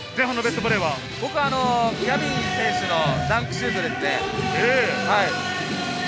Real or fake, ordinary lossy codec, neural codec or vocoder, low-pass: real; none; none; none